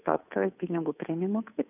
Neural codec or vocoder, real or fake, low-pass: codec, 16 kHz, 2 kbps, FunCodec, trained on Chinese and English, 25 frames a second; fake; 3.6 kHz